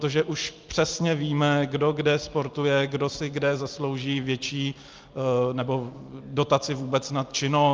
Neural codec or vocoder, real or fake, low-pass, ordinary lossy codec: none; real; 7.2 kHz; Opus, 24 kbps